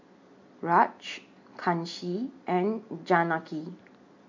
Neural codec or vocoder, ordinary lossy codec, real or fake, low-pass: none; MP3, 48 kbps; real; 7.2 kHz